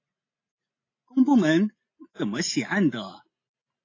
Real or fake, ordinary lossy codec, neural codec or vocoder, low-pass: real; AAC, 32 kbps; none; 7.2 kHz